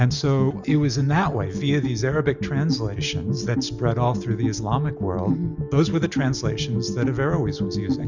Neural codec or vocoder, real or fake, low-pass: none; real; 7.2 kHz